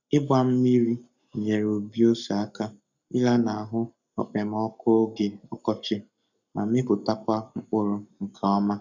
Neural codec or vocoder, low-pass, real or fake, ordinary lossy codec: codec, 44.1 kHz, 7.8 kbps, Pupu-Codec; 7.2 kHz; fake; none